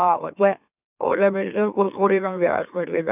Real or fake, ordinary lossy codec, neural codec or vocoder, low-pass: fake; none; autoencoder, 44.1 kHz, a latent of 192 numbers a frame, MeloTTS; 3.6 kHz